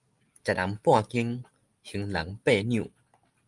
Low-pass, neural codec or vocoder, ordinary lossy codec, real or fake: 10.8 kHz; none; Opus, 32 kbps; real